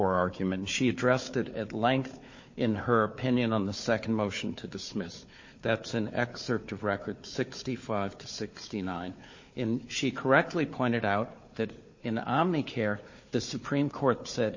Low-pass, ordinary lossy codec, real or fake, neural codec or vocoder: 7.2 kHz; MP3, 32 kbps; fake; codec, 16 kHz, 4 kbps, FunCodec, trained on Chinese and English, 50 frames a second